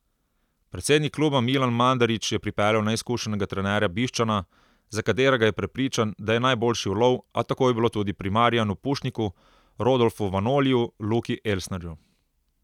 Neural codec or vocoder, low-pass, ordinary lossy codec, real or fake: none; 19.8 kHz; none; real